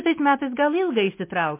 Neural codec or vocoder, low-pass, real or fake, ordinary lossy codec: none; 3.6 kHz; real; MP3, 24 kbps